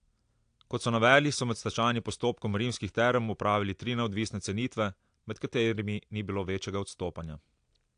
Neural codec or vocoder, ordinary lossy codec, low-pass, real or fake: none; AAC, 64 kbps; 9.9 kHz; real